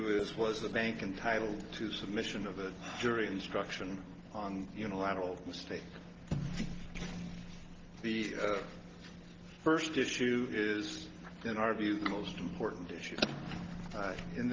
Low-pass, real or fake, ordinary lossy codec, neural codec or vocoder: 7.2 kHz; real; Opus, 16 kbps; none